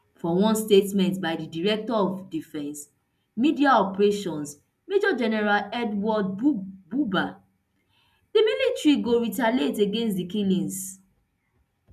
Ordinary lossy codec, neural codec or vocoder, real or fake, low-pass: none; none; real; 14.4 kHz